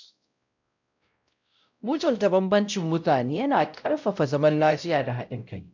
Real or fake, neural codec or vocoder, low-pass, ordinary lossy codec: fake; codec, 16 kHz, 0.5 kbps, X-Codec, WavLM features, trained on Multilingual LibriSpeech; 7.2 kHz; none